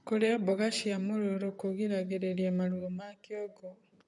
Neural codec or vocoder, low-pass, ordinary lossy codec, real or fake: vocoder, 44.1 kHz, 128 mel bands, Pupu-Vocoder; 10.8 kHz; none; fake